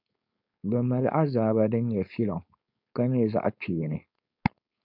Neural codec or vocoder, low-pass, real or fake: codec, 16 kHz, 4.8 kbps, FACodec; 5.4 kHz; fake